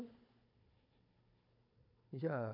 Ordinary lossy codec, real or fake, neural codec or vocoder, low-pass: AAC, 48 kbps; fake; codec, 16 kHz, 16 kbps, FunCodec, trained on Chinese and English, 50 frames a second; 5.4 kHz